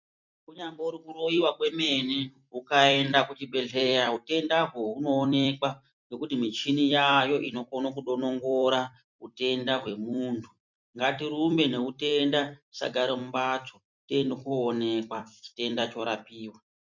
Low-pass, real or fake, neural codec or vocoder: 7.2 kHz; fake; vocoder, 44.1 kHz, 128 mel bands every 256 samples, BigVGAN v2